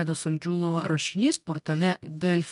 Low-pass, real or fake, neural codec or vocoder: 10.8 kHz; fake; codec, 24 kHz, 0.9 kbps, WavTokenizer, medium music audio release